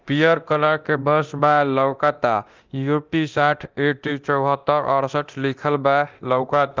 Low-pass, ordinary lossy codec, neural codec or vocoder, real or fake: 7.2 kHz; Opus, 24 kbps; codec, 24 kHz, 0.9 kbps, DualCodec; fake